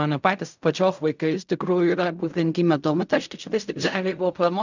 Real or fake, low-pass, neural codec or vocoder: fake; 7.2 kHz; codec, 16 kHz in and 24 kHz out, 0.4 kbps, LongCat-Audio-Codec, fine tuned four codebook decoder